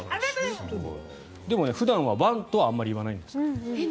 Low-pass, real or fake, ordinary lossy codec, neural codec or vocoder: none; real; none; none